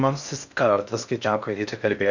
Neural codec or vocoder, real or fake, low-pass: codec, 16 kHz in and 24 kHz out, 0.6 kbps, FocalCodec, streaming, 4096 codes; fake; 7.2 kHz